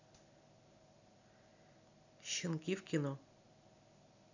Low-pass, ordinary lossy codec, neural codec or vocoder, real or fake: 7.2 kHz; none; none; real